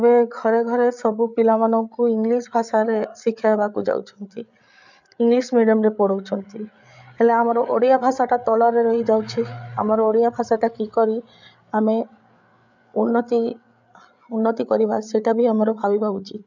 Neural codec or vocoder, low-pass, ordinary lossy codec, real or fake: codec, 16 kHz, 8 kbps, FreqCodec, larger model; 7.2 kHz; none; fake